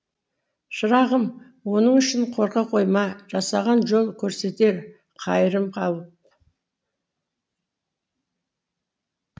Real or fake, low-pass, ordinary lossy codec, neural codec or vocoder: real; none; none; none